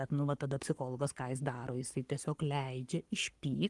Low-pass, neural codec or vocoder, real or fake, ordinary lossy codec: 10.8 kHz; codec, 44.1 kHz, 7.8 kbps, Pupu-Codec; fake; Opus, 24 kbps